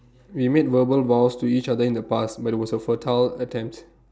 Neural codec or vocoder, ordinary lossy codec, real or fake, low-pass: none; none; real; none